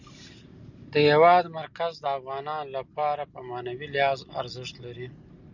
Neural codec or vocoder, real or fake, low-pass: none; real; 7.2 kHz